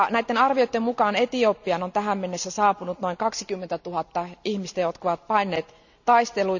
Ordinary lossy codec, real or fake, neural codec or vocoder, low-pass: none; real; none; 7.2 kHz